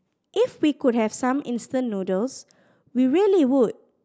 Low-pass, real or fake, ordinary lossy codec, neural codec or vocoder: none; real; none; none